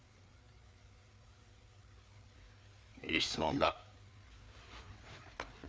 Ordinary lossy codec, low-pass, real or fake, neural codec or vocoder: none; none; fake; codec, 16 kHz, 4 kbps, FreqCodec, larger model